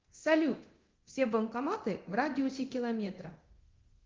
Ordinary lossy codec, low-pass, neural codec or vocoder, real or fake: Opus, 16 kbps; 7.2 kHz; codec, 24 kHz, 0.9 kbps, DualCodec; fake